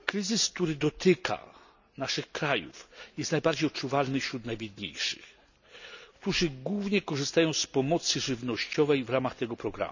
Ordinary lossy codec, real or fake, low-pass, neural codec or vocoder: none; real; 7.2 kHz; none